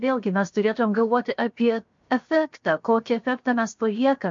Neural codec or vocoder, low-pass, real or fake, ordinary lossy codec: codec, 16 kHz, 0.7 kbps, FocalCodec; 7.2 kHz; fake; MP3, 48 kbps